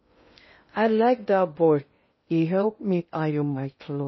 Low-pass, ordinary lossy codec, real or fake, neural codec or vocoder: 7.2 kHz; MP3, 24 kbps; fake; codec, 16 kHz in and 24 kHz out, 0.6 kbps, FocalCodec, streaming, 2048 codes